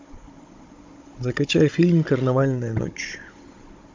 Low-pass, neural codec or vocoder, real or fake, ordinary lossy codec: 7.2 kHz; codec, 16 kHz, 16 kbps, FunCodec, trained on Chinese and English, 50 frames a second; fake; MP3, 64 kbps